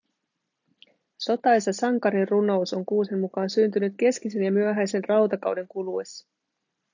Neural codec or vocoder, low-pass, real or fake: none; 7.2 kHz; real